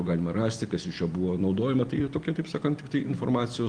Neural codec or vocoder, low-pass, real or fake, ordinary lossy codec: none; 9.9 kHz; real; Opus, 24 kbps